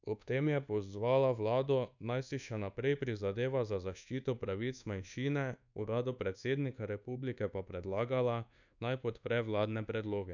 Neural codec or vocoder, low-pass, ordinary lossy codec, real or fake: codec, 24 kHz, 1.2 kbps, DualCodec; 7.2 kHz; none; fake